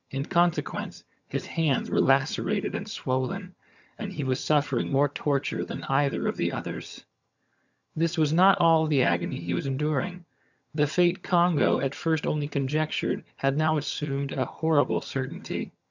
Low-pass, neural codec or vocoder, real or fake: 7.2 kHz; vocoder, 22.05 kHz, 80 mel bands, HiFi-GAN; fake